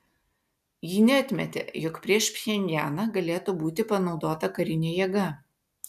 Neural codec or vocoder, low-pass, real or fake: none; 14.4 kHz; real